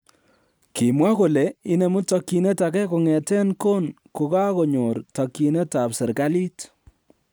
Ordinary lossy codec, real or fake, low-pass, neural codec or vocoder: none; real; none; none